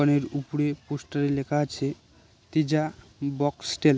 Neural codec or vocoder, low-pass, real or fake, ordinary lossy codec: none; none; real; none